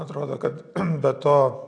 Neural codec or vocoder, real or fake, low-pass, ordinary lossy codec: none; real; 9.9 kHz; AAC, 48 kbps